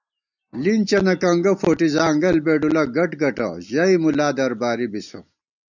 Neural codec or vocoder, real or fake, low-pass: none; real; 7.2 kHz